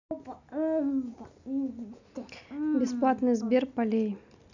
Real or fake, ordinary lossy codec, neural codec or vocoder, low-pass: real; none; none; 7.2 kHz